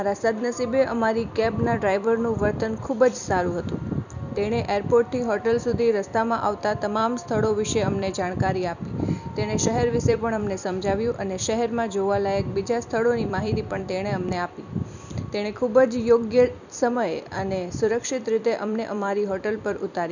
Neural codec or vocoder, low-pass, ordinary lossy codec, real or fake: vocoder, 44.1 kHz, 128 mel bands every 256 samples, BigVGAN v2; 7.2 kHz; none; fake